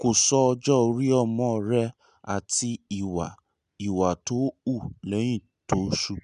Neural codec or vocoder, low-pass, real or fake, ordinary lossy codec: none; 10.8 kHz; real; none